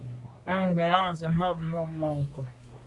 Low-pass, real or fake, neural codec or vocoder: 10.8 kHz; fake; codec, 24 kHz, 1 kbps, SNAC